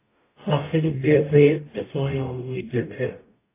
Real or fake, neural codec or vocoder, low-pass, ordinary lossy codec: fake; codec, 44.1 kHz, 0.9 kbps, DAC; 3.6 kHz; AAC, 24 kbps